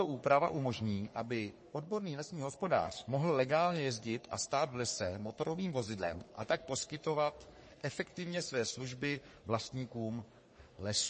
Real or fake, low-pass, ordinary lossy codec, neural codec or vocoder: fake; 10.8 kHz; MP3, 32 kbps; codec, 44.1 kHz, 3.4 kbps, Pupu-Codec